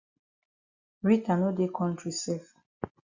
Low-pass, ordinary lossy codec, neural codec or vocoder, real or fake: 7.2 kHz; Opus, 64 kbps; none; real